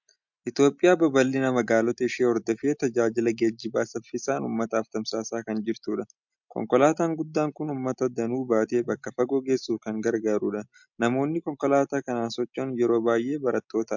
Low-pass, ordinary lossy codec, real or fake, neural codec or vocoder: 7.2 kHz; MP3, 64 kbps; real; none